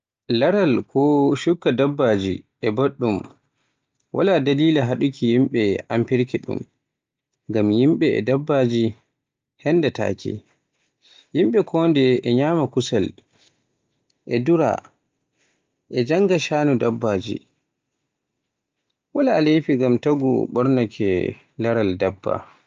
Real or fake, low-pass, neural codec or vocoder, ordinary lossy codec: real; 7.2 kHz; none; Opus, 24 kbps